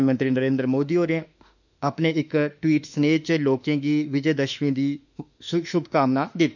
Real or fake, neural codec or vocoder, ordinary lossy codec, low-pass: fake; autoencoder, 48 kHz, 32 numbers a frame, DAC-VAE, trained on Japanese speech; none; 7.2 kHz